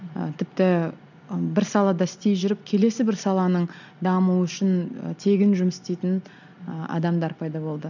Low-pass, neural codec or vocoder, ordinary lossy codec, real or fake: 7.2 kHz; none; none; real